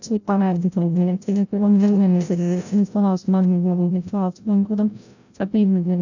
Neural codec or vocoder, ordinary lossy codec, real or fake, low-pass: codec, 16 kHz, 0.5 kbps, FreqCodec, larger model; none; fake; 7.2 kHz